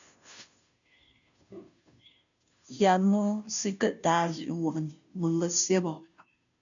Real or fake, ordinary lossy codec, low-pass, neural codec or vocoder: fake; MP3, 96 kbps; 7.2 kHz; codec, 16 kHz, 0.5 kbps, FunCodec, trained on Chinese and English, 25 frames a second